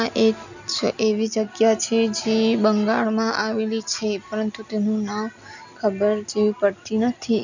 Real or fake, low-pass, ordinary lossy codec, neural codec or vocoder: real; 7.2 kHz; none; none